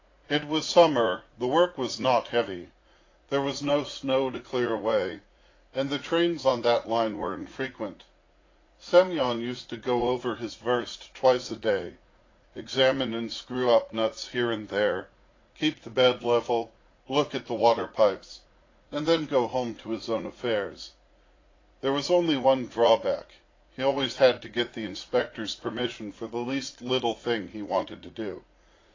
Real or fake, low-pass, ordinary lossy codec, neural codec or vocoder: fake; 7.2 kHz; AAC, 32 kbps; vocoder, 44.1 kHz, 80 mel bands, Vocos